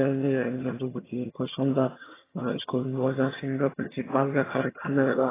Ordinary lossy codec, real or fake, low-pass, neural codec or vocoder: AAC, 16 kbps; fake; 3.6 kHz; vocoder, 22.05 kHz, 80 mel bands, HiFi-GAN